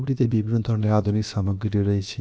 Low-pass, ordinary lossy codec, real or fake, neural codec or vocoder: none; none; fake; codec, 16 kHz, about 1 kbps, DyCAST, with the encoder's durations